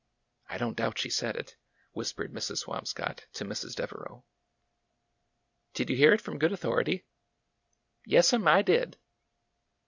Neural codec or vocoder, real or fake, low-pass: none; real; 7.2 kHz